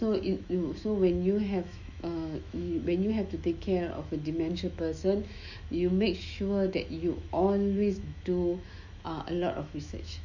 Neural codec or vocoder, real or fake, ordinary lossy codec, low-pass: autoencoder, 48 kHz, 128 numbers a frame, DAC-VAE, trained on Japanese speech; fake; none; 7.2 kHz